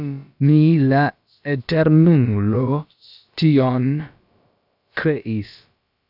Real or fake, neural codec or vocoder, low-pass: fake; codec, 16 kHz, about 1 kbps, DyCAST, with the encoder's durations; 5.4 kHz